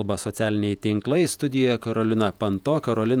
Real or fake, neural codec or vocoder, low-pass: fake; vocoder, 48 kHz, 128 mel bands, Vocos; 19.8 kHz